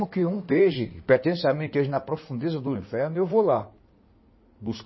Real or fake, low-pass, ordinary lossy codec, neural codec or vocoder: fake; 7.2 kHz; MP3, 24 kbps; codec, 16 kHz in and 24 kHz out, 2.2 kbps, FireRedTTS-2 codec